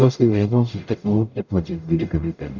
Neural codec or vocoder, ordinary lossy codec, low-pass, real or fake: codec, 44.1 kHz, 0.9 kbps, DAC; none; 7.2 kHz; fake